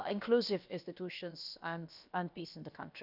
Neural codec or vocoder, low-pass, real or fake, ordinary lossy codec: codec, 16 kHz, about 1 kbps, DyCAST, with the encoder's durations; 5.4 kHz; fake; none